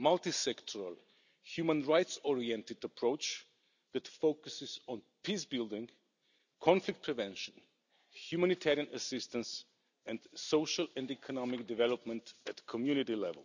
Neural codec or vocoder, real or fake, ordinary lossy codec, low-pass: none; real; none; 7.2 kHz